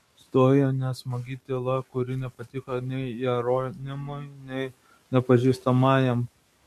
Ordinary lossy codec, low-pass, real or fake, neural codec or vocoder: MP3, 64 kbps; 14.4 kHz; fake; codec, 44.1 kHz, 7.8 kbps, DAC